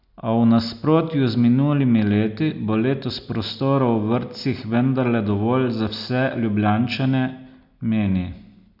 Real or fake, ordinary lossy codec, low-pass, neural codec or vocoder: real; none; 5.4 kHz; none